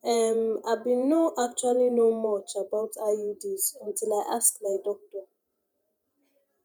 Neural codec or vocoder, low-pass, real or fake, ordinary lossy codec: vocoder, 48 kHz, 128 mel bands, Vocos; none; fake; none